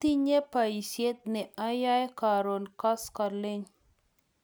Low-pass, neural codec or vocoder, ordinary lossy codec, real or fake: none; none; none; real